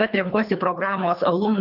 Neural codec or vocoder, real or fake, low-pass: codec, 24 kHz, 3 kbps, HILCodec; fake; 5.4 kHz